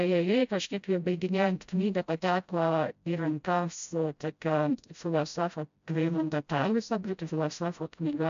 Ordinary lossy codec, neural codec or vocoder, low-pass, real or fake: MP3, 64 kbps; codec, 16 kHz, 0.5 kbps, FreqCodec, smaller model; 7.2 kHz; fake